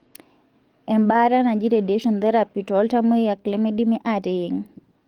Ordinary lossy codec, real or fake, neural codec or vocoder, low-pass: Opus, 24 kbps; fake; codec, 44.1 kHz, 7.8 kbps, DAC; 19.8 kHz